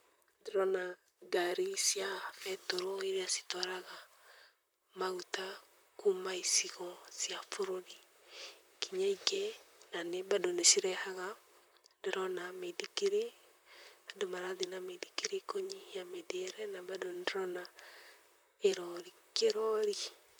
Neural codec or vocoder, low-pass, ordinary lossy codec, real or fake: vocoder, 44.1 kHz, 128 mel bands, Pupu-Vocoder; none; none; fake